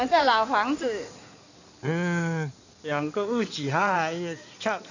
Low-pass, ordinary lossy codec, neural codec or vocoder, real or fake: 7.2 kHz; none; codec, 16 kHz in and 24 kHz out, 2.2 kbps, FireRedTTS-2 codec; fake